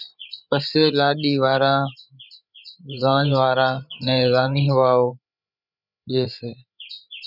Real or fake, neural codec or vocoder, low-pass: fake; codec, 16 kHz, 8 kbps, FreqCodec, larger model; 5.4 kHz